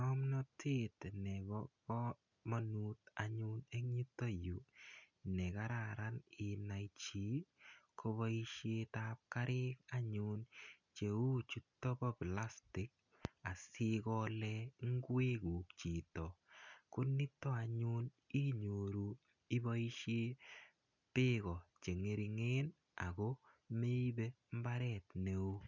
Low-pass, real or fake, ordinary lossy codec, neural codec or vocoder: 7.2 kHz; real; none; none